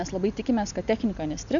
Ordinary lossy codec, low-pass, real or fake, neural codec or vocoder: MP3, 96 kbps; 7.2 kHz; real; none